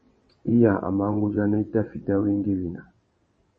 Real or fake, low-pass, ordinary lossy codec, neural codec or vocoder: fake; 9.9 kHz; MP3, 32 kbps; vocoder, 22.05 kHz, 80 mel bands, WaveNeXt